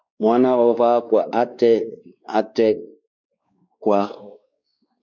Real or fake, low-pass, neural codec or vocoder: fake; 7.2 kHz; codec, 16 kHz, 2 kbps, X-Codec, WavLM features, trained on Multilingual LibriSpeech